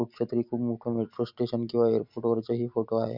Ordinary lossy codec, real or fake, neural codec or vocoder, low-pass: none; real; none; 5.4 kHz